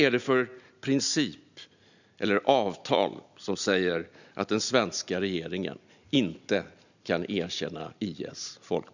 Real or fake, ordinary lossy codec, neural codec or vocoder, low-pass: real; none; none; 7.2 kHz